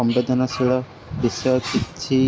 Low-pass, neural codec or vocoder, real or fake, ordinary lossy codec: none; none; real; none